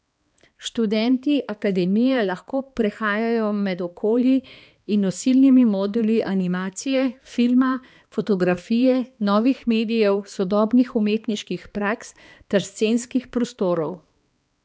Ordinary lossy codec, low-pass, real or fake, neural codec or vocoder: none; none; fake; codec, 16 kHz, 2 kbps, X-Codec, HuBERT features, trained on balanced general audio